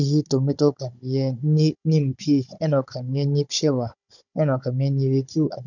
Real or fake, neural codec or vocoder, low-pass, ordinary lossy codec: fake; codec, 16 kHz, 16 kbps, FunCodec, trained on Chinese and English, 50 frames a second; 7.2 kHz; none